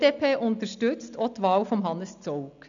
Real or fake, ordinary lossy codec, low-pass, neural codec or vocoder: real; none; 7.2 kHz; none